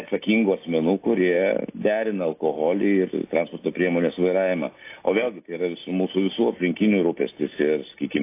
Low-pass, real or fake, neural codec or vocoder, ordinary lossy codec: 3.6 kHz; real; none; AAC, 24 kbps